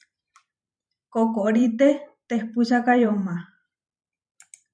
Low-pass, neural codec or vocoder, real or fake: 9.9 kHz; vocoder, 44.1 kHz, 128 mel bands every 512 samples, BigVGAN v2; fake